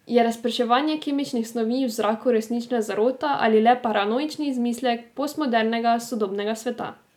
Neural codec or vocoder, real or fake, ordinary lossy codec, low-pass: none; real; none; 19.8 kHz